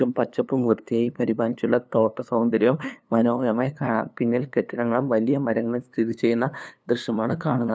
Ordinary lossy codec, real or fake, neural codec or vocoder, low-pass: none; fake; codec, 16 kHz, 2 kbps, FunCodec, trained on LibriTTS, 25 frames a second; none